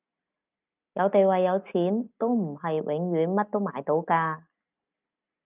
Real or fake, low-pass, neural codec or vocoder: real; 3.6 kHz; none